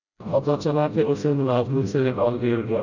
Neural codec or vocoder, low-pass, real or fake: codec, 16 kHz, 0.5 kbps, FreqCodec, smaller model; 7.2 kHz; fake